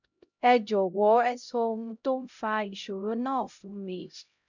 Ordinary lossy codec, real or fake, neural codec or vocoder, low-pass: none; fake; codec, 16 kHz, 0.5 kbps, X-Codec, HuBERT features, trained on LibriSpeech; 7.2 kHz